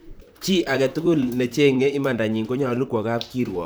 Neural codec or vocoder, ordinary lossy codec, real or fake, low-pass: vocoder, 44.1 kHz, 128 mel bands, Pupu-Vocoder; none; fake; none